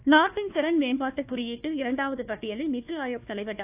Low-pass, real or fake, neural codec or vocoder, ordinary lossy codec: 3.6 kHz; fake; codec, 16 kHz, 1 kbps, FunCodec, trained on Chinese and English, 50 frames a second; AAC, 32 kbps